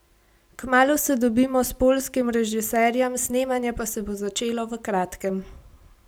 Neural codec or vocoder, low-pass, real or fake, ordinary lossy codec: none; none; real; none